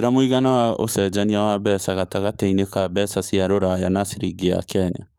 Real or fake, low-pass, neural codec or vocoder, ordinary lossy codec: fake; none; codec, 44.1 kHz, 7.8 kbps, DAC; none